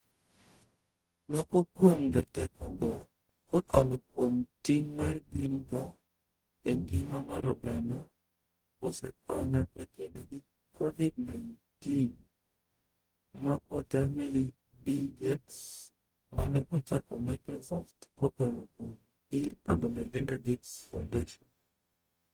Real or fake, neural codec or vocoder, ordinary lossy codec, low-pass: fake; codec, 44.1 kHz, 0.9 kbps, DAC; Opus, 24 kbps; 19.8 kHz